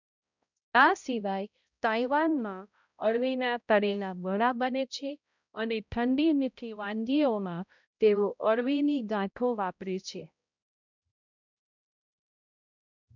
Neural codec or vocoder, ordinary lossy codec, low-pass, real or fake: codec, 16 kHz, 0.5 kbps, X-Codec, HuBERT features, trained on balanced general audio; none; 7.2 kHz; fake